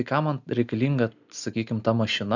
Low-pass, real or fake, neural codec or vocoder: 7.2 kHz; real; none